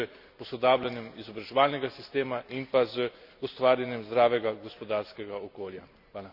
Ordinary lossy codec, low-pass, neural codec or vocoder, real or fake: Opus, 64 kbps; 5.4 kHz; none; real